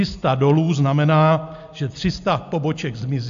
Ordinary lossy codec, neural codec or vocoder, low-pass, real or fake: AAC, 64 kbps; none; 7.2 kHz; real